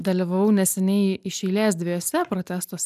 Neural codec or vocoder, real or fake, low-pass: none; real; 14.4 kHz